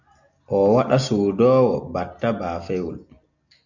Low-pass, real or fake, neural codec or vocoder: 7.2 kHz; real; none